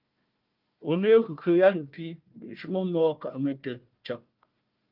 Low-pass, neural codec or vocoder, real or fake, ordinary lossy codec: 5.4 kHz; codec, 16 kHz, 1 kbps, FunCodec, trained on Chinese and English, 50 frames a second; fake; Opus, 32 kbps